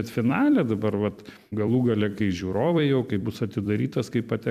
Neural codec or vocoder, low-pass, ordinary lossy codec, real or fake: vocoder, 44.1 kHz, 128 mel bands every 256 samples, BigVGAN v2; 14.4 kHz; MP3, 96 kbps; fake